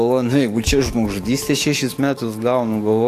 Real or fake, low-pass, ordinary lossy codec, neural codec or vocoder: fake; 14.4 kHz; AAC, 64 kbps; codec, 44.1 kHz, 7.8 kbps, DAC